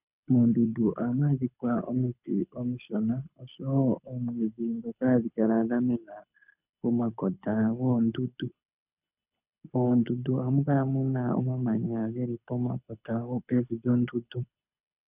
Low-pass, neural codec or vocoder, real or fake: 3.6 kHz; codec, 24 kHz, 6 kbps, HILCodec; fake